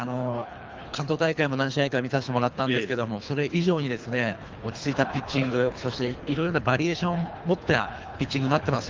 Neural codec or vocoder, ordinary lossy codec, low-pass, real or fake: codec, 24 kHz, 3 kbps, HILCodec; Opus, 32 kbps; 7.2 kHz; fake